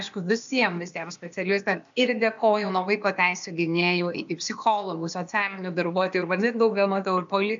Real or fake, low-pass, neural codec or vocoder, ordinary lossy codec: fake; 7.2 kHz; codec, 16 kHz, 0.8 kbps, ZipCodec; MP3, 64 kbps